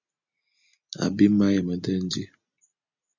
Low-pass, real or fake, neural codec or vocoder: 7.2 kHz; real; none